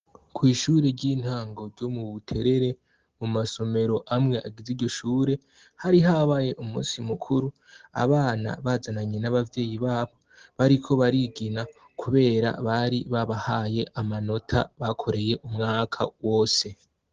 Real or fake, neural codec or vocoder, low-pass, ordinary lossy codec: real; none; 7.2 kHz; Opus, 16 kbps